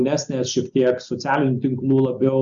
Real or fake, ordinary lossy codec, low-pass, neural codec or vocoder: real; Opus, 64 kbps; 7.2 kHz; none